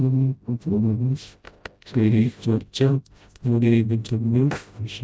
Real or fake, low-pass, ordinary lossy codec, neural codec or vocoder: fake; none; none; codec, 16 kHz, 0.5 kbps, FreqCodec, smaller model